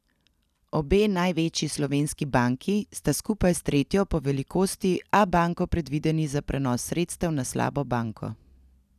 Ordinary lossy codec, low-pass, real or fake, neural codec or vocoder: none; 14.4 kHz; real; none